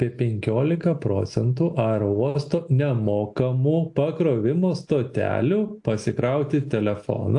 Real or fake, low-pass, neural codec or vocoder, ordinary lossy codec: real; 10.8 kHz; none; AAC, 64 kbps